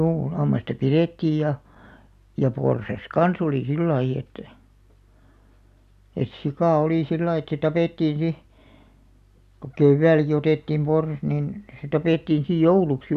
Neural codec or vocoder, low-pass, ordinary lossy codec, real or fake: none; 14.4 kHz; none; real